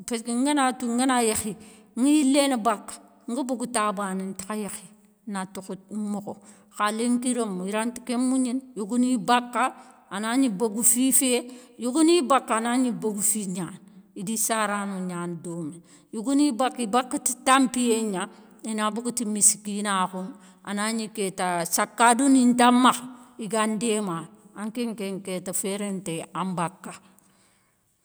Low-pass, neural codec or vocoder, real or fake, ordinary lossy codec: none; none; real; none